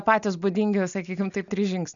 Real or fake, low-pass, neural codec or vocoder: real; 7.2 kHz; none